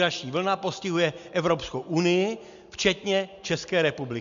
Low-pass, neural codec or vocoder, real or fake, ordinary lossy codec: 7.2 kHz; none; real; MP3, 64 kbps